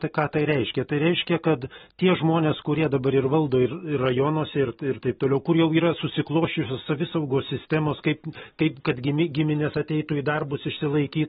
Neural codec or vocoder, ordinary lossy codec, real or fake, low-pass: none; AAC, 16 kbps; real; 7.2 kHz